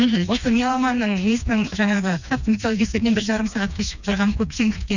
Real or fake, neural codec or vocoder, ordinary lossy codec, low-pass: fake; codec, 16 kHz, 2 kbps, FreqCodec, smaller model; none; 7.2 kHz